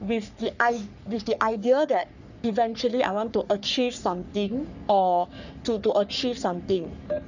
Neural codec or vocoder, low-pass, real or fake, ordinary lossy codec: codec, 44.1 kHz, 3.4 kbps, Pupu-Codec; 7.2 kHz; fake; none